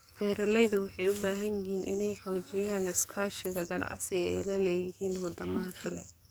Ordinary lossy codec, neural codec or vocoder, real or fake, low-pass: none; codec, 44.1 kHz, 2.6 kbps, SNAC; fake; none